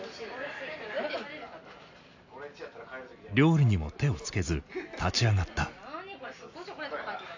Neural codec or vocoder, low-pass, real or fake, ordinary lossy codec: none; 7.2 kHz; real; AAC, 48 kbps